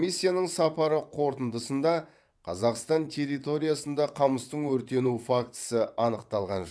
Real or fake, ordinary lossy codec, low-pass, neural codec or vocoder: fake; none; none; vocoder, 22.05 kHz, 80 mel bands, WaveNeXt